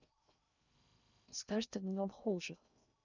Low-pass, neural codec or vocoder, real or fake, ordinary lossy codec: 7.2 kHz; codec, 16 kHz in and 24 kHz out, 0.6 kbps, FocalCodec, streaming, 2048 codes; fake; none